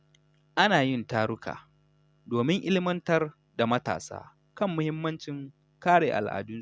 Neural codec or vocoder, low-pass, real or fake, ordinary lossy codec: none; none; real; none